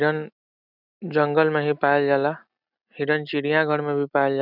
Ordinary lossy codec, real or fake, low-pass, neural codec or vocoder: none; real; 5.4 kHz; none